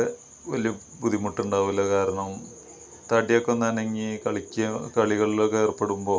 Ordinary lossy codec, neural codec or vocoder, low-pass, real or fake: none; none; none; real